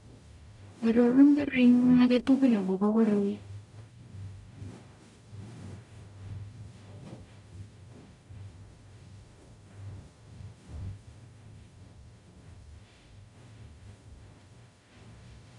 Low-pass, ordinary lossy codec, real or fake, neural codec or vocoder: 10.8 kHz; none; fake; codec, 44.1 kHz, 0.9 kbps, DAC